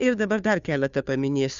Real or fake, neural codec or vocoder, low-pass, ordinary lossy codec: fake; codec, 16 kHz, 2 kbps, FunCodec, trained on Chinese and English, 25 frames a second; 7.2 kHz; Opus, 64 kbps